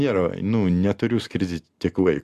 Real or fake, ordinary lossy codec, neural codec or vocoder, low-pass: real; Opus, 64 kbps; none; 14.4 kHz